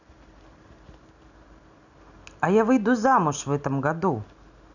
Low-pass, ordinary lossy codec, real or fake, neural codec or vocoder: 7.2 kHz; none; real; none